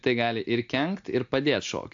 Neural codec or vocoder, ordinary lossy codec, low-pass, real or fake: none; AAC, 64 kbps; 7.2 kHz; real